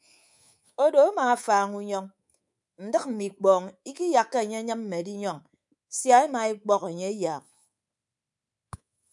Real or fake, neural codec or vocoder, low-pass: fake; codec, 24 kHz, 3.1 kbps, DualCodec; 10.8 kHz